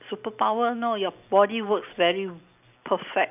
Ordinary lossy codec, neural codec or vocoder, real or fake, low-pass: none; none; real; 3.6 kHz